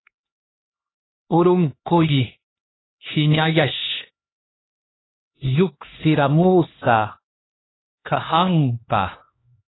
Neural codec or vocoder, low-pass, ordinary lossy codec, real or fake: codec, 16 kHz, 2 kbps, X-Codec, HuBERT features, trained on LibriSpeech; 7.2 kHz; AAC, 16 kbps; fake